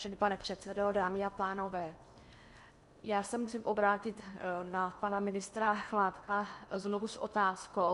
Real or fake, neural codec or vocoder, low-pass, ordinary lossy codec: fake; codec, 16 kHz in and 24 kHz out, 0.8 kbps, FocalCodec, streaming, 65536 codes; 10.8 kHz; MP3, 96 kbps